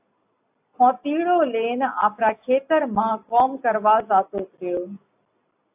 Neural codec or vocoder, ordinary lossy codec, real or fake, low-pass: none; AAC, 32 kbps; real; 3.6 kHz